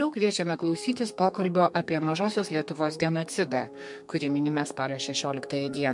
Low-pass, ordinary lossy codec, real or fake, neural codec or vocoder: 10.8 kHz; MP3, 64 kbps; fake; codec, 32 kHz, 1.9 kbps, SNAC